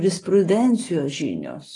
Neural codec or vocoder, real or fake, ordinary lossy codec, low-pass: none; real; AAC, 32 kbps; 10.8 kHz